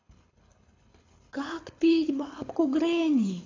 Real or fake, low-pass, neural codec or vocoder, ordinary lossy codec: fake; 7.2 kHz; codec, 24 kHz, 6 kbps, HILCodec; AAC, 32 kbps